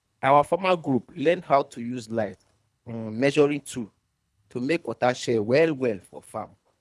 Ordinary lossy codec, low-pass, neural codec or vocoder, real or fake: none; none; codec, 24 kHz, 3 kbps, HILCodec; fake